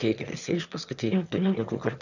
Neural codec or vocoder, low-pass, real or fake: autoencoder, 22.05 kHz, a latent of 192 numbers a frame, VITS, trained on one speaker; 7.2 kHz; fake